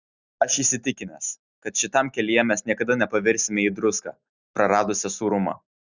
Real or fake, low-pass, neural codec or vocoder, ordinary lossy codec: real; 7.2 kHz; none; Opus, 64 kbps